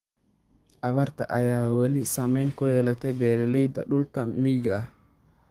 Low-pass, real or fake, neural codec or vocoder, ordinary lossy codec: 14.4 kHz; fake; codec, 32 kHz, 1.9 kbps, SNAC; Opus, 32 kbps